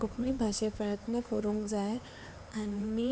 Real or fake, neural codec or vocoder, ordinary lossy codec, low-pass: fake; codec, 16 kHz, 4 kbps, X-Codec, HuBERT features, trained on LibriSpeech; none; none